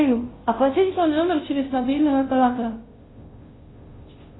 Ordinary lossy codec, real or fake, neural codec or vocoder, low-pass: AAC, 16 kbps; fake; codec, 16 kHz, 0.5 kbps, FunCodec, trained on Chinese and English, 25 frames a second; 7.2 kHz